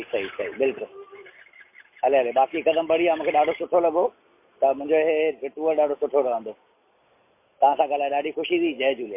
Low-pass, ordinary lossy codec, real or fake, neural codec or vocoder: 3.6 kHz; MP3, 24 kbps; real; none